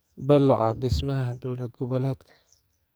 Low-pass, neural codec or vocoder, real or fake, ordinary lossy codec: none; codec, 44.1 kHz, 2.6 kbps, SNAC; fake; none